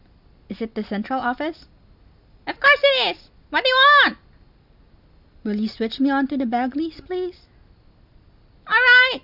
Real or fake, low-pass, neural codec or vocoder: real; 5.4 kHz; none